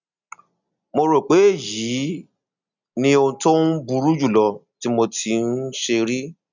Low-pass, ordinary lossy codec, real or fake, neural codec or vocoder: 7.2 kHz; none; real; none